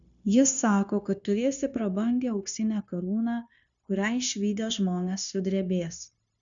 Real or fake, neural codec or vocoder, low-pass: fake; codec, 16 kHz, 0.9 kbps, LongCat-Audio-Codec; 7.2 kHz